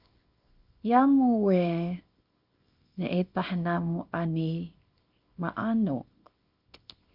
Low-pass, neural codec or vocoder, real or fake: 5.4 kHz; codec, 24 kHz, 0.9 kbps, WavTokenizer, small release; fake